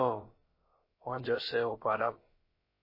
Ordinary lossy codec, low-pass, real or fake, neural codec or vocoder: MP3, 24 kbps; 5.4 kHz; fake; codec, 16 kHz, about 1 kbps, DyCAST, with the encoder's durations